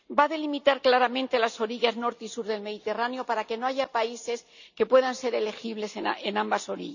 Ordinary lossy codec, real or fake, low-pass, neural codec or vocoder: none; real; 7.2 kHz; none